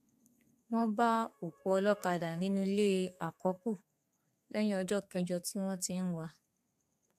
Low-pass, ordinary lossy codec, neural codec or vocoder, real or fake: 14.4 kHz; AAC, 96 kbps; codec, 32 kHz, 1.9 kbps, SNAC; fake